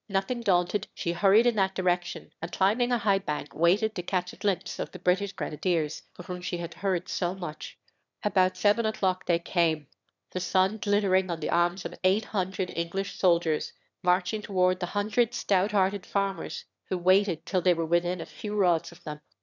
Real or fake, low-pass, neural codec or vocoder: fake; 7.2 kHz; autoencoder, 22.05 kHz, a latent of 192 numbers a frame, VITS, trained on one speaker